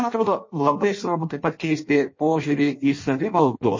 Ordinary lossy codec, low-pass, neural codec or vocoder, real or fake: MP3, 32 kbps; 7.2 kHz; codec, 16 kHz in and 24 kHz out, 0.6 kbps, FireRedTTS-2 codec; fake